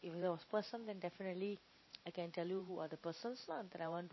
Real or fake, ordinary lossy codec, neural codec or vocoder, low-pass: fake; MP3, 24 kbps; vocoder, 44.1 kHz, 128 mel bands every 512 samples, BigVGAN v2; 7.2 kHz